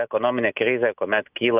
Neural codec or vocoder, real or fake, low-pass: none; real; 3.6 kHz